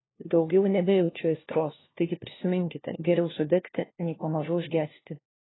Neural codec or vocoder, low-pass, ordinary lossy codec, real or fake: codec, 16 kHz, 1 kbps, FunCodec, trained on LibriTTS, 50 frames a second; 7.2 kHz; AAC, 16 kbps; fake